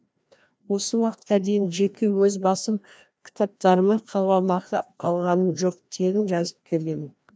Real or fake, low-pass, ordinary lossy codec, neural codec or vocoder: fake; none; none; codec, 16 kHz, 1 kbps, FreqCodec, larger model